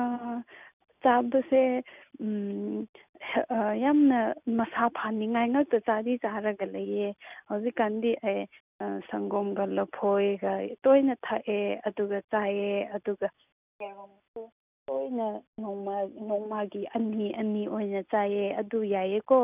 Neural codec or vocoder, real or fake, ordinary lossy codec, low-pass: none; real; none; 3.6 kHz